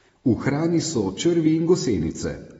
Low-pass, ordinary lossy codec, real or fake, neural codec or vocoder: 19.8 kHz; AAC, 24 kbps; real; none